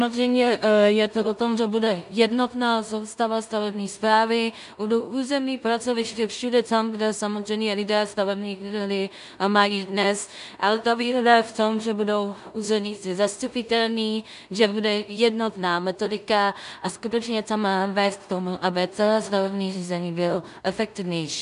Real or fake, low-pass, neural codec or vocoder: fake; 10.8 kHz; codec, 16 kHz in and 24 kHz out, 0.4 kbps, LongCat-Audio-Codec, two codebook decoder